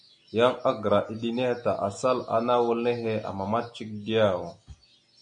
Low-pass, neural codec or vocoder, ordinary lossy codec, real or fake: 9.9 kHz; none; MP3, 64 kbps; real